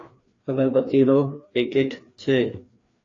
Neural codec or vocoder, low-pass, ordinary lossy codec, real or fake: codec, 16 kHz, 2 kbps, FreqCodec, larger model; 7.2 kHz; AAC, 32 kbps; fake